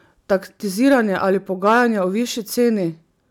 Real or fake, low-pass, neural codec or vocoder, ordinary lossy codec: real; 19.8 kHz; none; none